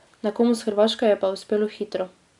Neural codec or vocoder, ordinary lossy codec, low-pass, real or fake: none; none; 10.8 kHz; real